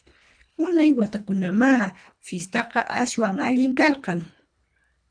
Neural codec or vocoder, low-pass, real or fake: codec, 24 kHz, 1.5 kbps, HILCodec; 9.9 kHz; fake